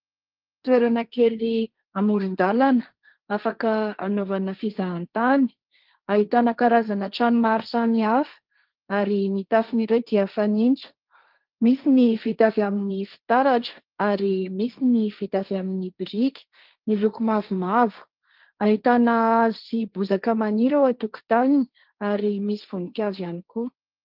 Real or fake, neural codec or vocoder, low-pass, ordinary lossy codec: fake; codec, 16 kHz, 1.1 kbps, Voila-Tokenizer; 5.4 kHz; Opus, 32 kbps